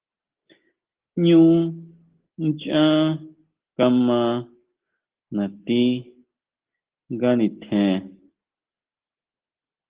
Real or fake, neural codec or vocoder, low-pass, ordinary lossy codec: real; none; 3.6 kHz; Opus, 16 kbps